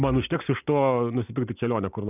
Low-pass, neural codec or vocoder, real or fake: 3.6 kHz; none; real